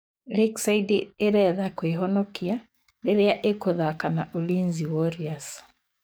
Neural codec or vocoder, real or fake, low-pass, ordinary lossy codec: codec, 44.1 kHz, 7.8 kbps, DAC; fake; none; none